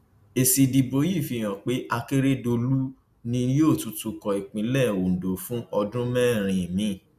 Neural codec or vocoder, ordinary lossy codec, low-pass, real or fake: none; none; 14.4 kHz; real